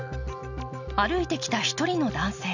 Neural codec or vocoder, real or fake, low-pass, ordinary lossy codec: none; real; 7.2 kHz; none